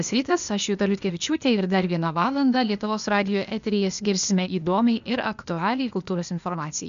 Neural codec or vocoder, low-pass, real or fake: codec, 16 kHz, 0.8 kbps, ZipCodec; 7.2 kHz; fake